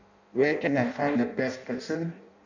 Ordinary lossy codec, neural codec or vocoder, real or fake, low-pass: none; codec, 16 kHz in and 24 kHz out, 0.6 kbps, FireRedTTS-2 codec; fake; 7.2 kHz